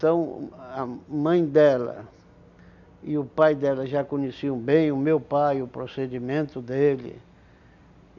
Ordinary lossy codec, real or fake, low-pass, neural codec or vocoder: none; real; 7.2 kHz; none